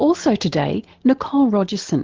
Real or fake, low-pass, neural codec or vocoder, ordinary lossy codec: real; 7.2 kHz; none; Opus, 16 kbps